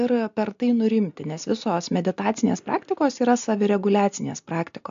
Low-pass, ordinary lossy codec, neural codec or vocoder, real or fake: 7.2 kHz; AAC, 64 kbps; none; real